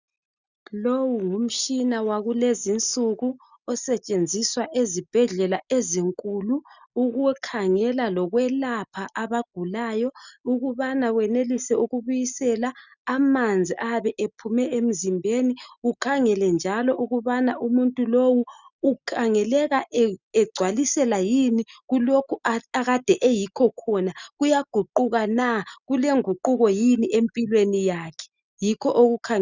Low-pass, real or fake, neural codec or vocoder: 7.2 kHz; real; none